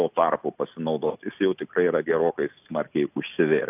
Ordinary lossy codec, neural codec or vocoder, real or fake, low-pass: AAC, 32 kbps; none; real; 3.6 kHz